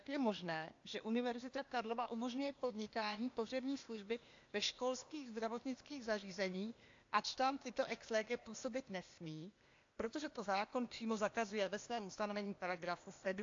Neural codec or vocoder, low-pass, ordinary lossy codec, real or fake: codec, 16 kHz, 0.8 kbps, ZipCodec; 7.2 kHz; AAC, 48 kbps; fake